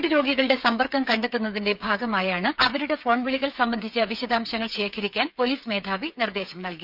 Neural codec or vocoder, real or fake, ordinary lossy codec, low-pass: codec, 16 kHz, 8 kbps, FreqCodec, smaller model; fake; none; 5.4 kHz